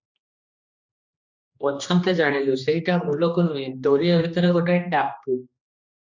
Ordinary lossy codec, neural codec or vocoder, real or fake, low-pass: MP3, 64 kbps; codec, 16 kHz, 2 kbps, X-Codec, HuBERT features, trained on general audio; fake; 7.2 kHz